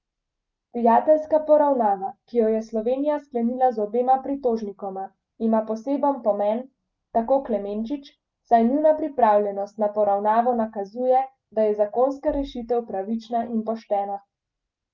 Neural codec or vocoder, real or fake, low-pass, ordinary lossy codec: none; real; 7.2 kHz; Opus, 24 kbps